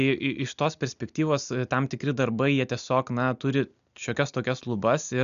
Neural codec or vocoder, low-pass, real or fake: none; 7.2 kHz; real